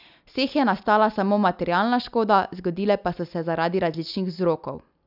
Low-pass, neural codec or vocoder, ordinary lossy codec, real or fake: 5.4 kHz; none; none; real